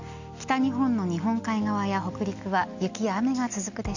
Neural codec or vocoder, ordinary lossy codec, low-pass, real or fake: none; Opus, 64 kbps; 7.2 kHz; real